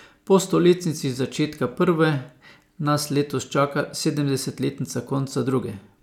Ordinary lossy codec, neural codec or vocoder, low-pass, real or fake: none; none; 19.8 kHz; real